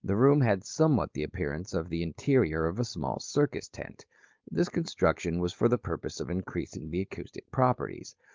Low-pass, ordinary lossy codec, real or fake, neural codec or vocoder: 7.2 kHz; Opus, 24 kbps; fake; codec, 16 kHz, 4.8 kbps, FACodec